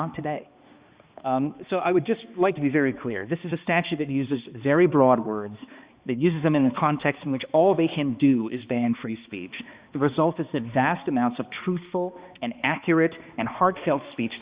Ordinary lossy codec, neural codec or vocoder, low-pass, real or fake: Opus, 64 kbps; codec, 16 kHz, 2 kbps, X-Codec, HuBERT features, trained on balanced general audio; 3.6 kHz; fake